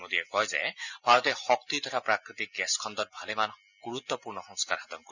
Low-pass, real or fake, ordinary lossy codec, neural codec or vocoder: 7.2 kHz; real; none; none